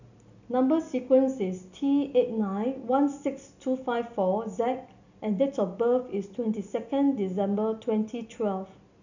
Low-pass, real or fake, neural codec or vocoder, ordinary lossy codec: 7.2 kHz; real; none; none